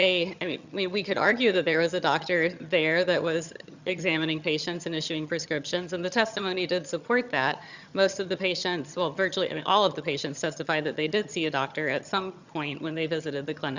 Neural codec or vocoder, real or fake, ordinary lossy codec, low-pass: vocoder, 22.05 kHz, 80 mel bands, HiFi-GAN; fake; Opus, 64 kbps; 7.2 kHz